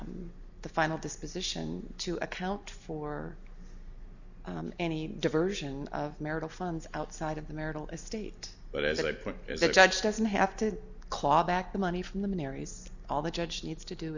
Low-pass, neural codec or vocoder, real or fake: 7.2 kHz; none; real